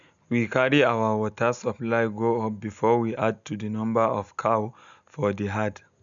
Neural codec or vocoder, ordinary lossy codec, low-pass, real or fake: none; none; 7.2 kHz; real